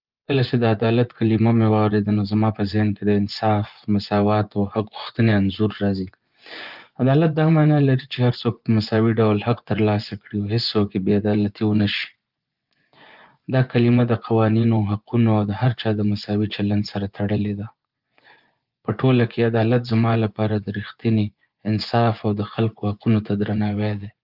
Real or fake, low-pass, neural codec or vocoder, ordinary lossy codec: fake; 5.4 kHz; vocoder, 24 kHz, 100 mel bands, Vocos; Opus, 24 kbps